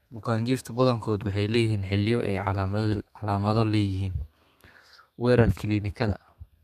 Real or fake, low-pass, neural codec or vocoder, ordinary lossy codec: fake; 14.4 kHz; codec, 32 kHz, 1.9 kbps, SNAC; none